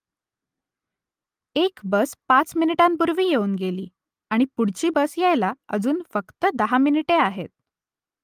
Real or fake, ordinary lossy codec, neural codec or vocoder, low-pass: real; Opus, 32 kbps; none; 14.4 kHz